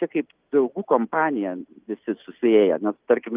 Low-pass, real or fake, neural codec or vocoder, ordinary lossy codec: 3.6 kHz; real; none; Opus, 24 kbps